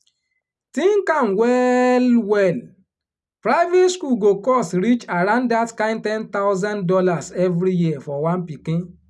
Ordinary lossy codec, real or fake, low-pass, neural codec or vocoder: none; real; none; none